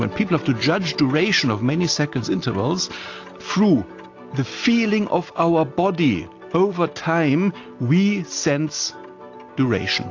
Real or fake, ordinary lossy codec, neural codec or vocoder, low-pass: real; AAC, 48 kbps; none; 7.2 kHz